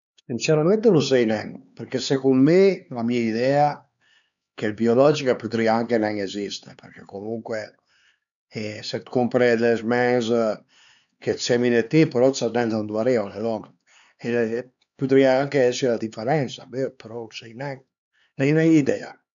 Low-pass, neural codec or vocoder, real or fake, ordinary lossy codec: 7.2 kHz; codec, 16 kHz, 4 kbps, X-Codec, HuBERT features, trained on LibriSpeech; fake; none